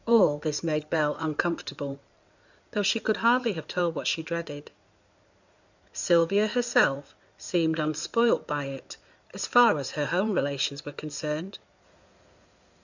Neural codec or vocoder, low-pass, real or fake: codec, 16 kHz in and 24 kHz out, 2.2 kbps, FireRedTTS-2 codec; 7.2 kHz; fake